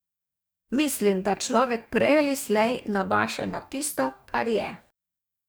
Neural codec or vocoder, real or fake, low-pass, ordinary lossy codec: codec, 44.1 kHz, 2.6 kbps, DAC; fake; none; none